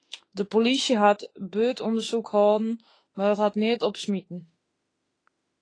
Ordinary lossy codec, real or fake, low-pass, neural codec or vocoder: AAC, 32 kbps; fake; 9.9 kHz; autoencoder, 48 kHz, 32 numbers a frame, DAC-VAE, trained on Japanese speech